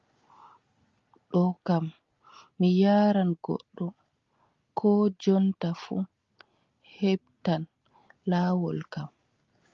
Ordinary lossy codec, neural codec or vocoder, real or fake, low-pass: Opus, 32 kbps; none; real; 7.2 kHz